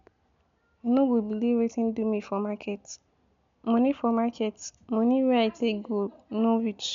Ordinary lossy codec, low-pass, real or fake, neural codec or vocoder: MP3, 64 kbps; 7.2 kHz; real; none